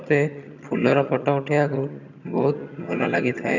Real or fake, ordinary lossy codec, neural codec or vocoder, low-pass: fake; none; vocoder, 22.05 kHz, 80 mel bands, HiFi-GAN; 7.2 kHz